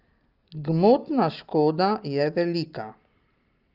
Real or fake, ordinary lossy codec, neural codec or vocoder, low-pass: real; Opus, 24 kbps; none; 5.4 kHz